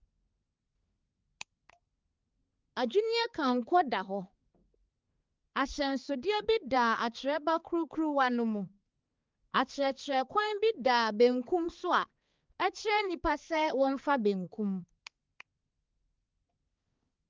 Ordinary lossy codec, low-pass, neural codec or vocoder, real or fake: Opus, 32 kbps; 7.2 kHz; codec, 16 kHz, 4 kbps, X-Codec, HuBERT features, trained on balanced general audio; fake